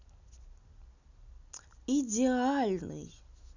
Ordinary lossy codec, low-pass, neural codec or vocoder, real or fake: none; 7.2 kHz; none; real